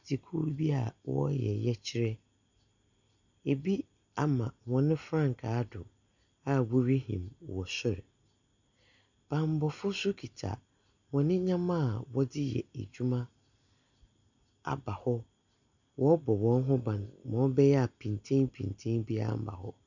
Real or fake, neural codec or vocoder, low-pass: real; none; 7.2 kHz